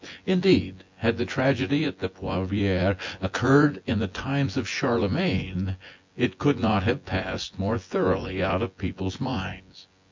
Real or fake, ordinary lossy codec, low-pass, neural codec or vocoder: fake; MP3, 48 kbps; 7.2 kHz; vocoder, 24 kHz, 100 mel bands, Vocos